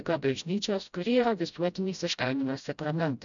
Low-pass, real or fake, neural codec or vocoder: 7.2 kHz; fake; codec, 16 kHz, 0.5 kbps, FreqCodec, smaller model